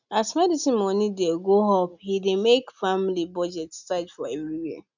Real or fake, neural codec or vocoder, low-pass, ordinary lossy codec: real; none; 7.2 kHz; none